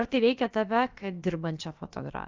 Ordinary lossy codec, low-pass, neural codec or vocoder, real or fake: Opus, 32 kbps; 7.2 kHz; codec, 16 kHz, about 1 kbps, DyCAST, with the encoder's durations; fake